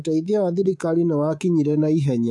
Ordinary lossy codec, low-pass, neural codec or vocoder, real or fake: none; none; codec, 24 kHz, 3.1 kbps, DualCodec; fake